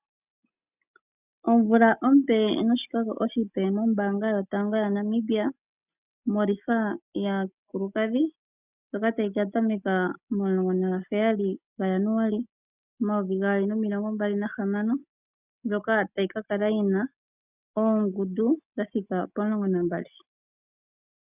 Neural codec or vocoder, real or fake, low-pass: none; real; 3.6 kHz